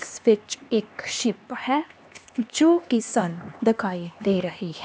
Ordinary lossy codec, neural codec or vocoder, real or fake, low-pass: none; codec, 16 kHz, 2 kbps, X-Codec, HuBERT features, trained on LibriSpeech; fake; none